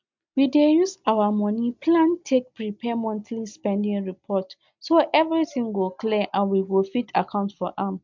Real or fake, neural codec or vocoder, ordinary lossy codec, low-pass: real; none; MP3, 64 kbps; 7.2 kHz